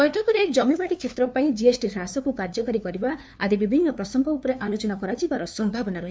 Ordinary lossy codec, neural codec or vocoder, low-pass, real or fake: none; codec, 16 kHz, 2 kbps, FunCodec, trained on LibriTTS, 25 frames a second; none; fake